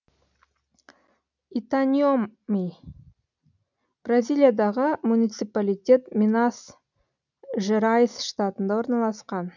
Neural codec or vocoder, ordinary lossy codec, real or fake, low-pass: none; none; real; 7.2 kHz